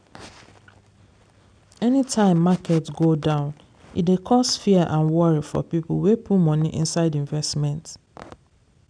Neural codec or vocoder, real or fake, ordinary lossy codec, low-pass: none; real; none; 9.9 kHz